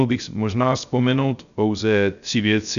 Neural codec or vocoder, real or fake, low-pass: codec, 16 kHz, 0.3 kbps, FocalCodec; fake; 7.2 kHz